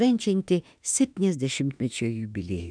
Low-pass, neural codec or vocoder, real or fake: 9.9 kHz; autoencoder, 48 kHz, 32 numbers a frame, DAC-VAE, trained on Japanese speech; fake